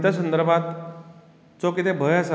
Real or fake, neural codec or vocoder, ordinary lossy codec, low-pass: real; none; none; none